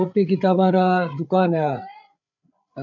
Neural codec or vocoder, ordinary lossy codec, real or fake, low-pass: codec, 16 kHz, 8 kbps, FreqCodec, larger model; none; fake; 7.2 kHz